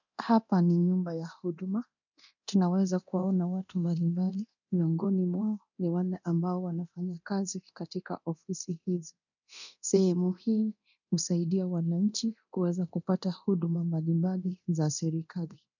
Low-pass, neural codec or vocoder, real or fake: 7.2 kHz; codec, 24 kHz, 0.9 kbps, DualCodec; fake